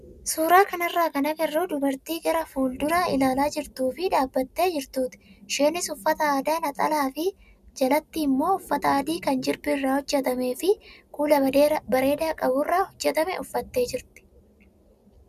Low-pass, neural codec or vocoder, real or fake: 14.4 kHz; none; real